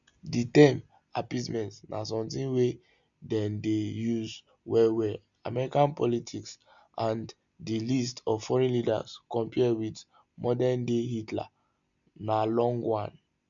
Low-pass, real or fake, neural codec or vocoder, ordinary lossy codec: 7.2 kHz; real; none; none